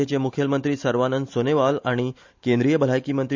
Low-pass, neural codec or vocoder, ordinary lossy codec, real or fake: 7.2 kHz; none; MP3, 64 kbps; real